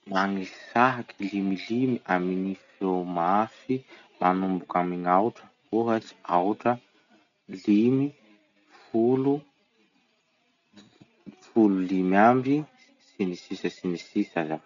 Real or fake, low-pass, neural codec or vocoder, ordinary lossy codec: real; 7.2 kHz; none; none